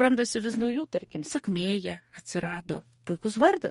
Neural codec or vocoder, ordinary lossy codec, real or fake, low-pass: codec, 44.1 kHz, 2.6 kbps, DAC; MP3, 48 kbps; fake; 19.8 kHz